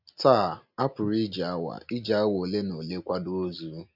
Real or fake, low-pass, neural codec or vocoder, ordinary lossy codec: real; 5.4 kHz; none; none